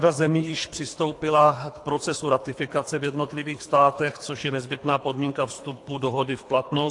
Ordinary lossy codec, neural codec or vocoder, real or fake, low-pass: AAC, 48 kbps; codec, 24 kHz, 3 kbps, HILCodec; fake; 10.8 kHz